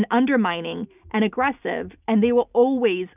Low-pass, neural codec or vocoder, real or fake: 3.6 kHz; none; real